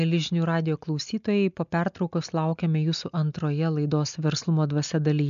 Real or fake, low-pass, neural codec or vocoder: real; 7.2 kHz; none